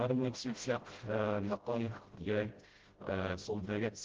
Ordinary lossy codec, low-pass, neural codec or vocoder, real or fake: Opus, 16 kbps; 7.2 kHz; codec, 16 kHz, 0.5 kbps, FreqCodec, smaller model; fake